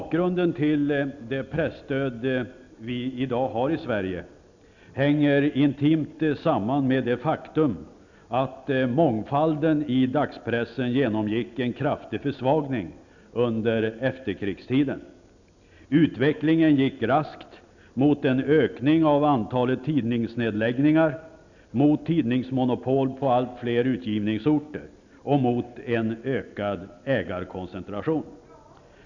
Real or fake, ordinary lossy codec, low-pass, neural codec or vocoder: real; none; 7.2 kHz; none